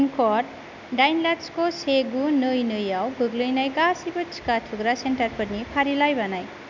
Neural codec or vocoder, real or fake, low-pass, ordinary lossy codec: none; real; 7.2 kHz; none